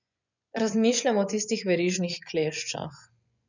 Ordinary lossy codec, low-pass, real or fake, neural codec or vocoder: none; 7.2 kHz; fake; vocoder, 24 kHz, 100 mel bands, Vocos